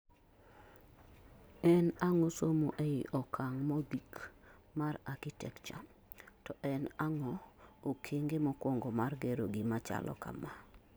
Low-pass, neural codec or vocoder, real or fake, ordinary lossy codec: none; none; real; none